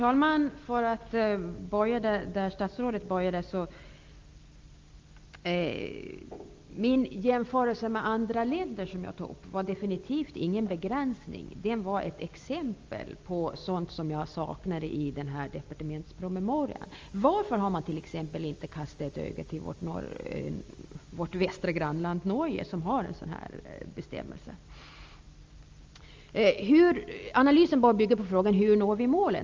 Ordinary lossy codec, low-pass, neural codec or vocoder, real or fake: Opus, 32 kbps; 7.2 kHz; none; real